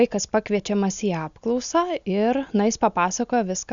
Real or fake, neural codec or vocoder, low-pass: real; none; 7.2 kHz